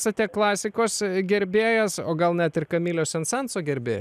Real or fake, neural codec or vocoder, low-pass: real; none; 14.4 kHz